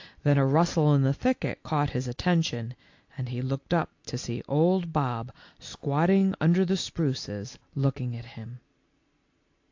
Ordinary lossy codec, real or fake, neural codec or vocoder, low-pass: AAC, 48 kbps; real; none; 7.2 kHz